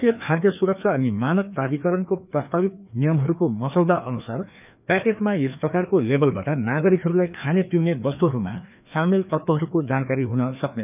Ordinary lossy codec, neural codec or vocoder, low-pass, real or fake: none; codec, 16 kHz, 2 kbps, FreqCodec, larger model; 3.6 kHz; fake